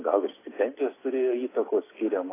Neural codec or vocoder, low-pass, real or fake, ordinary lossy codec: none; 3.6 kHz; real; AAC, 16 kbps